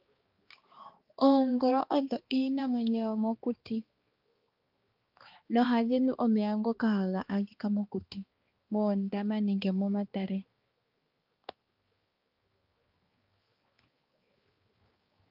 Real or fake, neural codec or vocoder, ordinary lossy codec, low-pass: fake; codec, 16 kHz, 2 kbps, X-Codec, HuBERT features, trained on balanced general audio; Opus, 24 kbps; 5.4 kHz